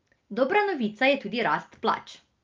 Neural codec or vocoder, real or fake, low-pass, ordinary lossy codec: none; real; 7.2 kHz; Opus, 32 kbps